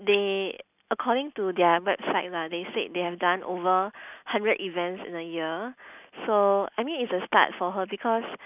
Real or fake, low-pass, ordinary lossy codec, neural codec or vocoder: real; 3.6 kHz; none; none